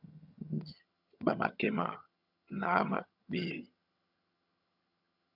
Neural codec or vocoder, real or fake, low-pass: vocoder, 22.05 kHz, 80 mel bands, HiFi-GAN; fake; 5.4 kHz